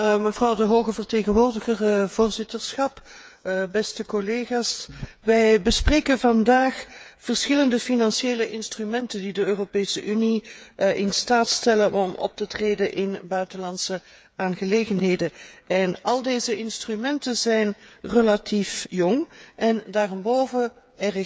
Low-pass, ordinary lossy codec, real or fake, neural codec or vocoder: none; none; fake; codec, 16 kHz, 8 kbps, FreqCodec, smaller model